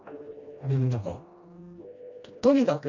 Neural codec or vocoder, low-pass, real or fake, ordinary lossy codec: codec, 16 kHz, 1 kbps, FreqCodec, smaller model; 7.2 kHz; fake; none